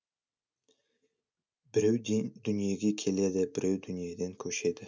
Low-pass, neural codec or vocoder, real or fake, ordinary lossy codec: none; none; real; none